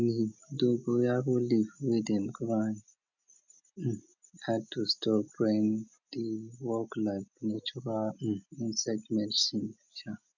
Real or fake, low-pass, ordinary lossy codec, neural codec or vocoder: real; 7.2 kHz; none; none